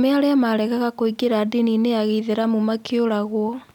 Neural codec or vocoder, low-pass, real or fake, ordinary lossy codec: none; 19.8 kHz; real; none